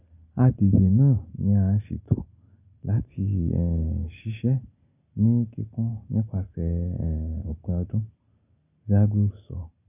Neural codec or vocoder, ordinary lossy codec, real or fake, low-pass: none; none; real; 3.6 kHz